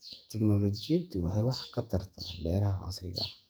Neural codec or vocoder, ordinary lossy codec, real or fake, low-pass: codec, 44.1 kHz, 2.6 kbps, SNAC; none; fake; none